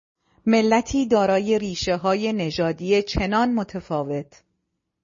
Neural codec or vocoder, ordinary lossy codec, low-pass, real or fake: none; MP3, 32 kbps; 7.2 kHz; real